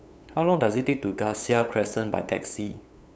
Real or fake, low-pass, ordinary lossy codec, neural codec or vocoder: fake; none; none; codec, 16 kHz, 8 kbps, FunCodec, trained on LibriTTS, 25 frames a second